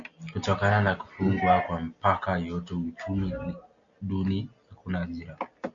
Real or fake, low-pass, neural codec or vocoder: real; 7.2 kHz; none